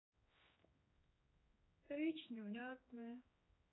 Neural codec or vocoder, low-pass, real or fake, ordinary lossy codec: codec, 16 kHz, 2 kbps, X-Codec, HuBERT features, trained on general audio; 7.2 kHz; fake; AAC, 16 kbps